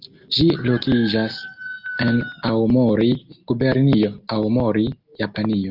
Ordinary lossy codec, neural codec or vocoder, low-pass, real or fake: Opus, 32 kbps; none; 5.4 kHz; real